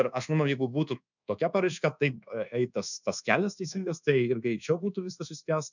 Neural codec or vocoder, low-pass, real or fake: codec, 24 kHz, 1.2 kbps, DualCodec; 7.2 kHz; fake